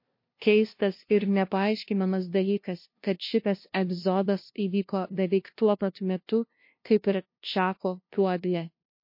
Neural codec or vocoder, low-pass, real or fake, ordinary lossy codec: codec, 16 kHz, 0.5 kbps, FunCodec, trained on LibriTTS, 25 frames a second; 5.4 kHz; fake; MP3, 32 kbps